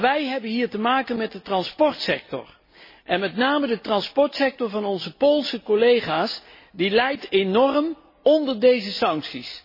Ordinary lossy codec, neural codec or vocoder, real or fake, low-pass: MP3, 24 kbps; none; real; 5.4 kHz